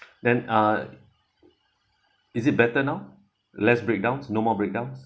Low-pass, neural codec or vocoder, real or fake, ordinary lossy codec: none; none; real; none